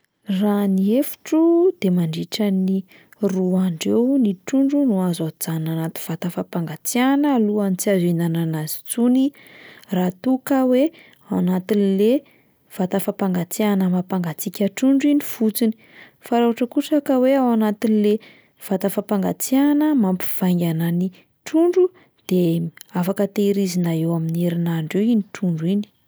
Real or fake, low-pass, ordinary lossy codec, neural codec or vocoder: real; none; none; none